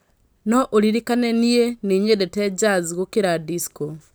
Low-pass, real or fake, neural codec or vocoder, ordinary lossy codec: none; real; none; none